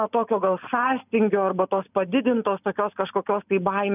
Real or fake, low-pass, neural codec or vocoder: real; 3.6 kHz; none